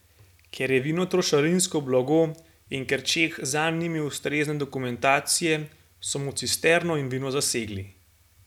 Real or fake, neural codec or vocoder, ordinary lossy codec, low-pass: real; none; none; 19.8 kHz